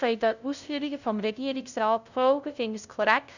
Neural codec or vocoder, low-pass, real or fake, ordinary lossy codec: codec, 16 kHz, 0.5 kbps, FunCodec, trained on LibriTTS, 25 frames a second; 7.2 kHz; fake; none